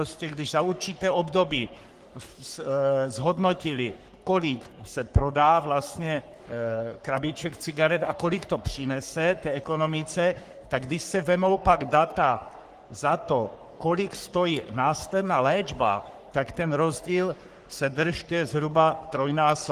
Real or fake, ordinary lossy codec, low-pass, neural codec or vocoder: fake; Opus, 24 kbps; 14.4 kHz; codec, 44.1 kHz, 3.4 kbps, Pupu-Codec